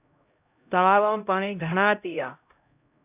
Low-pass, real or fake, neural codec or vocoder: 3.6 kHz; fake; codec, 16 kHz, 0.5 kbps, X-Codec, HuBERT features, trained on LibriSpeech